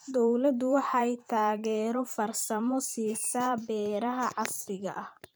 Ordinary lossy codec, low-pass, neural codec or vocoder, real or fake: none; none; vocoder, 44.1 kHz, 128 mel bands every 512 samples, BigVGAN v2; fake